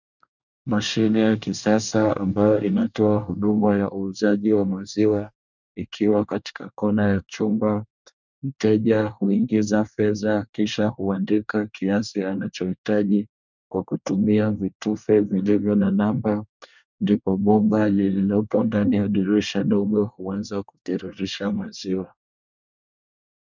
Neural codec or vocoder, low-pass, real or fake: codec, 24 kHz, 1 kbps, SNAC; 7.2 kHz; fake